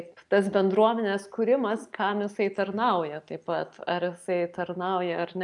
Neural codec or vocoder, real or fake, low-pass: none; real; 10.8 kHz